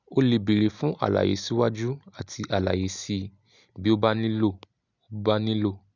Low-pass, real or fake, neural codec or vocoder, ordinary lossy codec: 7.2 kHz; real; none; none